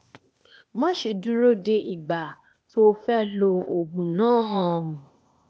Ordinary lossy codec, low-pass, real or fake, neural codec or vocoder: none; none; fake; codec, 16 kHz, 0.8 kbps, ZipCodec